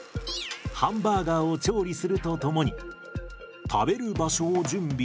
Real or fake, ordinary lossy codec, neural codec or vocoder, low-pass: real; none; none; none